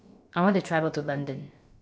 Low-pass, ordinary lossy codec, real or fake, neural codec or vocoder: none; none; fake; codec, 16 kHz, about 1 kbps, DyCAST, with the encoder's durations